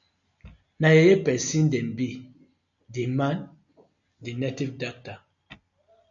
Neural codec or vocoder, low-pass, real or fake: none; 7.2 kHz; real